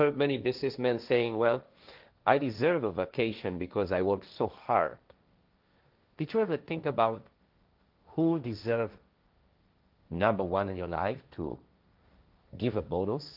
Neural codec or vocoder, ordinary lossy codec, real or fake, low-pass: codec, 16 kHz, 1.1 kbps, Voila-Tokenizer; Opus, 32 kbps; fake; 5.4 kHz